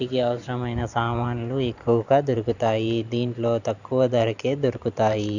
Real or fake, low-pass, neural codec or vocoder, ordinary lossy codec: fake; 7.2 kHz; vocoder, 44.1 kHz, 128 mel bands every 512 samples, BigVGAN v2; none